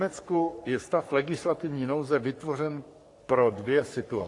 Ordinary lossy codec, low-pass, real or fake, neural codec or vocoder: AAC, 48 kbps; 10.8 kHz; fake; codec, 44.1 kHz, 3.4 kbps, Pupu-Codec